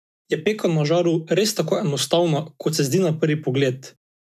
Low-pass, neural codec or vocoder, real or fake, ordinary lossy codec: 14.4 kHz; none; real; none